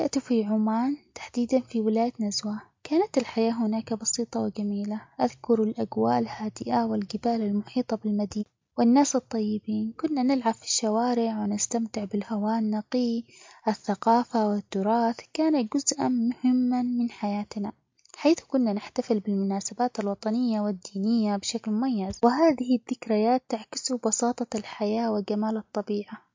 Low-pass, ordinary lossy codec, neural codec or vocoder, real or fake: 7.2 kHz; MP3, 48 kbps; none; real